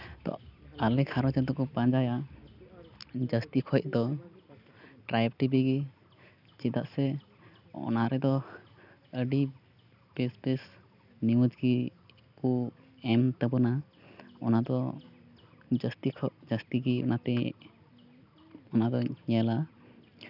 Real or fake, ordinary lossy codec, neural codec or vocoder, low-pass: real; none; none; 5.4 kHz